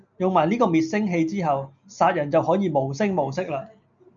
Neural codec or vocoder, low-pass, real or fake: none; 7.2 kHz; real